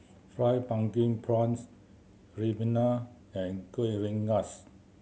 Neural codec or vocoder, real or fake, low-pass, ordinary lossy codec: none; real; none; none